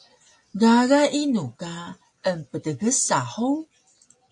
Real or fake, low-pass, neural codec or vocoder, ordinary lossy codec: real; 10.8 kHz; none; AAC, 64 kbps